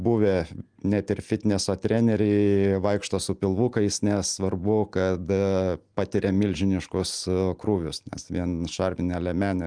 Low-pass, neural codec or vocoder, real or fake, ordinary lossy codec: 9.9 kHz; none; real; Opus, 32 kbps